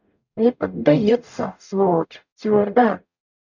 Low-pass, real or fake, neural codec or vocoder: 7.2 kHz; fake; codec, 44.1 kHz, 0.9 kbps, DAC